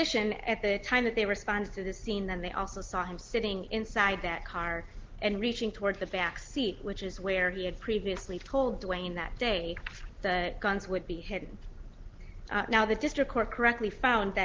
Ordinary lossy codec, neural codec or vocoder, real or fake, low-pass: Opus, 16 kbps; none; real; 7.2 kHz